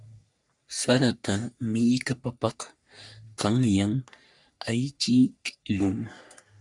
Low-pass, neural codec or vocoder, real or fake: 10.8 kHz; codec, 44.1 kHz, 3.4 kbps, Pupu-Codec; fake